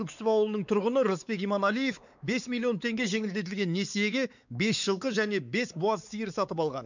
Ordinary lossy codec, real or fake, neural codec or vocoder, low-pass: none; fake; codec, 16 kHz, 4 kbps, X-Codec, WavLM features, trained on Multilingual LibriSpeech; 7.2 kHz